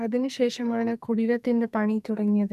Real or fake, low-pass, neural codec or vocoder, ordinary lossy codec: fake; 14.4 kHz; codec, 44.1 kHz, 2.6 kbps, SNAC; none